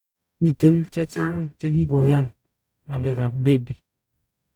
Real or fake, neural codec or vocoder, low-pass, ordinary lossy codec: fake; codec, 44.1 kHz, 0.9 kbps, DAC; 19.8 kHz; none